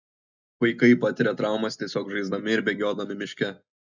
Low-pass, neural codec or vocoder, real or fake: 7.2 kHz; none; real